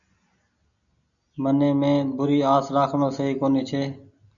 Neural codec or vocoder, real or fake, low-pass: none; real; 7.2 kHz